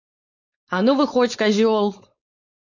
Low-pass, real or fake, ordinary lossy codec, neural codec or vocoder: 7.2 kHz; fake; MP3, 48 kbps; codec, 16 kHz, 4.8 kbps, FACodec